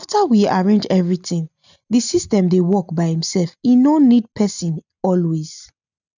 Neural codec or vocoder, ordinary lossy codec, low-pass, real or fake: none; none; 7.2 kHz; real